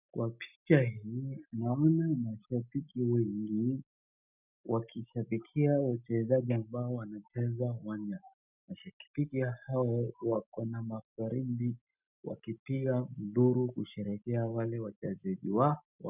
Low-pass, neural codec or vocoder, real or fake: 3.6 kHz; none; real